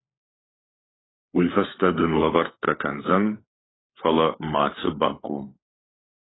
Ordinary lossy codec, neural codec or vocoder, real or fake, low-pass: AAC, 16 kbps; codec, 16 kHz, 4 kbps, FunCodec, trained on LibriTTS, 50 frames a second; fake; 7.2 kHz